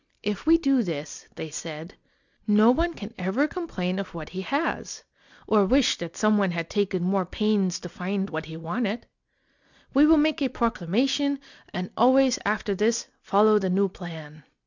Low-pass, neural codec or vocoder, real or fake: 7.2 kHz; none; real